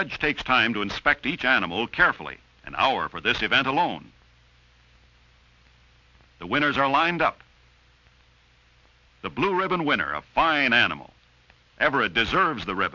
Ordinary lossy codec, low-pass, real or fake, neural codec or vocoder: MP3, 64 kbps; 7.2 kHz; real; none